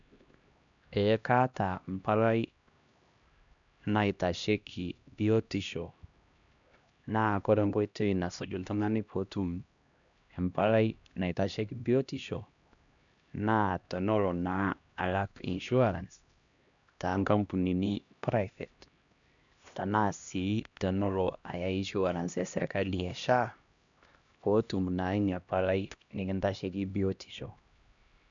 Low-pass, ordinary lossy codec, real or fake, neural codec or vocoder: 7.2 kHz; MP3, 96 kbps; fake; codec, 16 kHz, 1 kbps, X-Codec, HuBERT features, trained on LibriSpeech